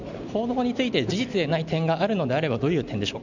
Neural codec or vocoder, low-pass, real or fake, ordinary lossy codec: none; 7.2 kHz; real; none